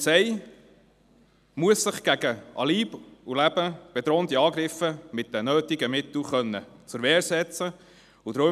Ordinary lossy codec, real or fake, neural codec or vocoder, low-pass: none; real; none; 14.4 kHz